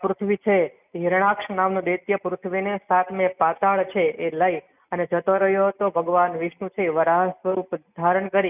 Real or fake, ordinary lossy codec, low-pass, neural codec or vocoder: real; none; 3.6 kHz; none